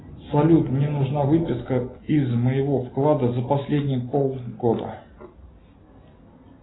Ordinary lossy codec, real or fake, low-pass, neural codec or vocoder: AAC, 16 kbps; real; 7.2 kHz; none